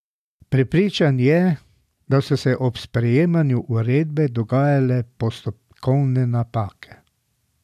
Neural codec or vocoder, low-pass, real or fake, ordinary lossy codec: none; 14.4 kHz; real; none